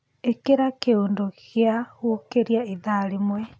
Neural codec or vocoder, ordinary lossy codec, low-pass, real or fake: none; none; none; real